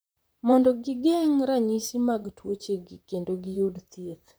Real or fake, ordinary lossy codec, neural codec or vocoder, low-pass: fake; none; vocoder, 44.1 kHz, 128 mel bands, Pupu-Vocoder; none